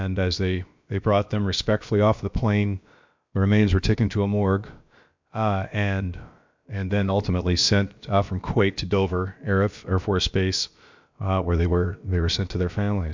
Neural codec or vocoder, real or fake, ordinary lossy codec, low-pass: codec, 16 kHz, about 1 kbps, DyCAST, with the encoder's durations; fake; MP3, 64 kbps; 7.2 kHz